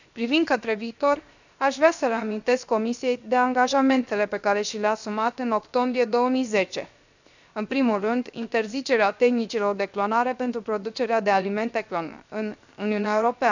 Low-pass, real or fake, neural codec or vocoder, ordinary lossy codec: 7.2 kHz; fake; codec, 16 kHz, 0.7 kbps, FocalCodec; none